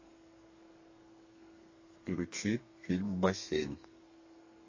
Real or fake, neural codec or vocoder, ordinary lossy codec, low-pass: fake; codec, 32 kHz, 1.9 kbps, SNAC; MP3, 32 kbps; 7.2 kHz